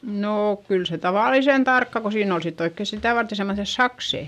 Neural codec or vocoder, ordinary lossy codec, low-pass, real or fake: none; none; 14.4 kHz; real